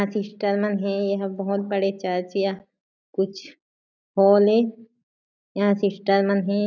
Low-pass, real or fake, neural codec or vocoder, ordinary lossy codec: 7.2 kHz; real; none; none